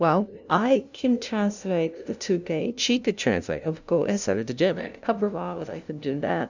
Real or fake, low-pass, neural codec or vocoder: fake; 7.2 kHz; codec, 16 kHz, 0.5 kbps, FunCodec, trained on LibriTTS, 25 frames a second